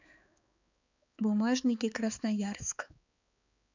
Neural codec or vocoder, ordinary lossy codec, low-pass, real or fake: codec, 16 kHz, 4 kbps, X-Codec, HuBERT features, trained on balanced general audio; none; 7.2 kHz; fake